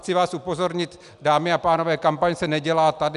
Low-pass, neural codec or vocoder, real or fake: 10.8 kHz; none; real